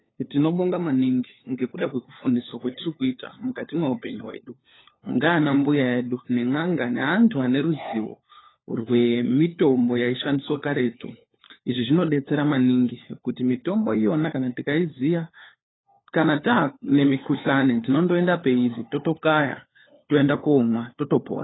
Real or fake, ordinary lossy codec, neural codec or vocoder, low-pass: fake; AAC, 16 kbps; codec, 16 kHz, 4 kbps, FunCodec, trained on LibriTTS, 50 frames a second; 7.2 kHz